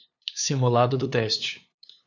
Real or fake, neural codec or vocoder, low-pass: fake; codec, 16 kHz, 2 kbps, FunCodec, trained on LibriTTS, 25 frames a second; 7.2 kHz